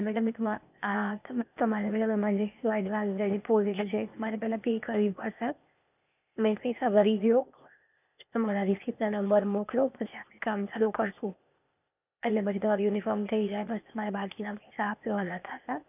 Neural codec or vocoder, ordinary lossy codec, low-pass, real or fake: codec, 16 kHz, 0.8 kbps, ZipCodec; none; 3.6 kHz; fake